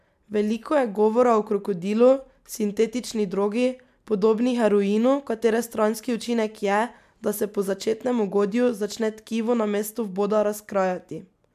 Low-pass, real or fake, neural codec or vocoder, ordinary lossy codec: 14.4 kHz; real; none; MP3, 96 kbps